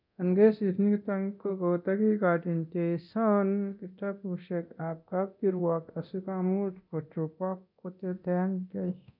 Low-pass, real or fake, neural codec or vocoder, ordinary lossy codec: 5.4 kHz; fake; codec, 24 kHz, 0.9 kbps, DualCodec; none